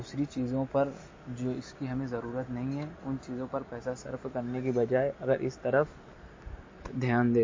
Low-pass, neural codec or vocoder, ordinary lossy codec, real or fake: 7.2 kHz; none; MP3, 32 kbps; real